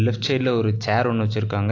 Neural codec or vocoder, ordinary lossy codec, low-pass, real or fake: none; AAC, 48 kbps; 7.2 kHz; real